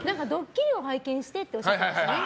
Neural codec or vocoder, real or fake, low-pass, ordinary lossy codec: none; real; none; none